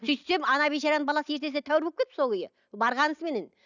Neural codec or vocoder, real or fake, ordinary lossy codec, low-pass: none; real; none; 7.2 kHz